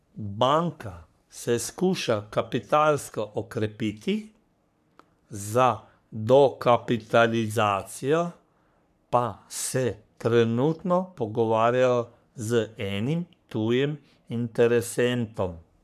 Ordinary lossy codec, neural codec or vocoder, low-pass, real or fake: none; codec, 44.1 kHz, 3.4 kbps, Pupu-Codec; 14.4 kHz; fake